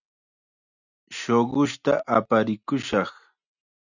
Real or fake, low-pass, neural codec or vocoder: real; 7.2 kHz; none